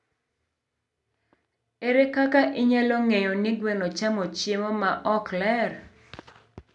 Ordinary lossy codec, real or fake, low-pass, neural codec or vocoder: none; real; 10.8 kHz; none